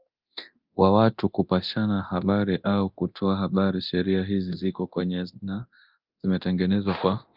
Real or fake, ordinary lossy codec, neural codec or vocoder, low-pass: fake; Opus, 24 kbps; codec, 24 kHz, 0.9 kbps, DualCodec; 5.4 kHz